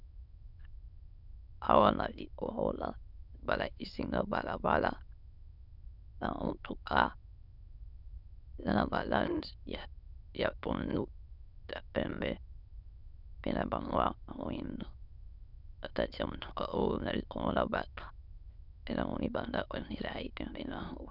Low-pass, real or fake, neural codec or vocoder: 5.4 kHz; fake; autoencoder, 22.05 kHz, a latent of 192 numbers a frame, VITS, trained on many speakers